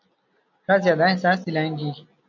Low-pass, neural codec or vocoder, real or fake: 7.2 kHz; none; real